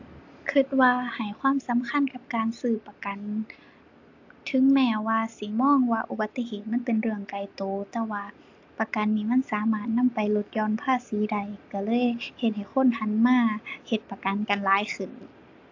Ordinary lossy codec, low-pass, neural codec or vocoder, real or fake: AAC, 48 kbps; 7.2 kHz; none; real